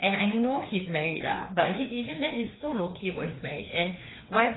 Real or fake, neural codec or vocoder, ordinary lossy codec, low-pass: fake; codec, 16 kHz, 2 kbps, FreqCodec, larger model; AAC, 16 kbps; 7.2 kHz